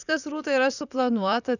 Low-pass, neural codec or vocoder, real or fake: 7.2 kHz; vocoder, 44.1 kHz, 128 mel bands, Pupu-Vocoder; fake